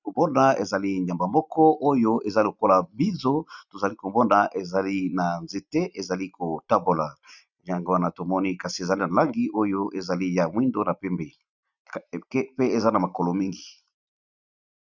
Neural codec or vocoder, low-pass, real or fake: none; 7.2 kHz; real